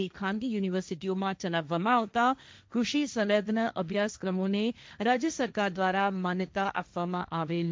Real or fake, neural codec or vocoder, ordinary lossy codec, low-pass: fake; codec, 16 kHz, 1.1 kbps, Voila-Tokenizer; none; none